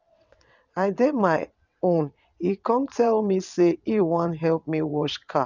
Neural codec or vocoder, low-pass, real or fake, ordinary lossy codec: vocoder, 22.05 kHz, 80 mel bands, WaveNeXt; 7.2 kHz; fake; none